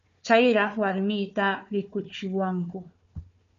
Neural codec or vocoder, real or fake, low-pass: codec, 16 kHz, 4 kbps, FunCodec, trained on Chinese and English, 50 frames a second; fake; 7.2 kHz